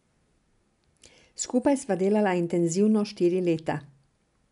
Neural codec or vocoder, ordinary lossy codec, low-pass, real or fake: none; none; 10.8 kHz; real